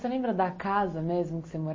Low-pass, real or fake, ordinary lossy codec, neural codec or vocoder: 7.2 kHz; real; MP3, 32 kbps; none